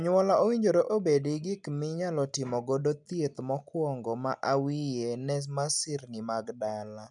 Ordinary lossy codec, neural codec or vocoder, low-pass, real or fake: none; none; 10.8 kHz; real